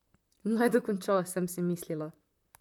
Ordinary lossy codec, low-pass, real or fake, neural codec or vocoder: none; 19.8 kHz; fake; vocoder, 44.1 kHz, 128 mel bands, Pupu-Vocoder